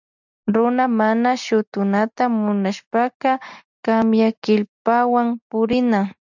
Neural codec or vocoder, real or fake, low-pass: none; real; 7.2 kHz